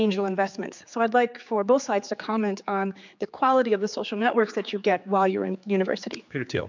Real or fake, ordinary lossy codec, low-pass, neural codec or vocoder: fake; MP3, 64 kbps; 7.2 kHz; codec, 16 kHz, 4 kbps, X-Codec, HuBERT features, trained on general audio